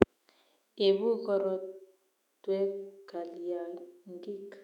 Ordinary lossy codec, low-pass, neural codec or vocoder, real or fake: none; 19.8 kHz; autoencoder, 48 kHz, 128 numbers a frame, DAC-VAE, trained on Japanese speech; fake